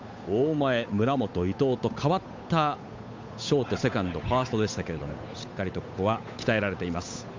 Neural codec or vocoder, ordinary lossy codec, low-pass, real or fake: codec, 16 kHz, 8 kbps, FunCodec, trained on Chinese and English, 25 frames a second; MP3, 64 kbps; 7.2 kHz; fake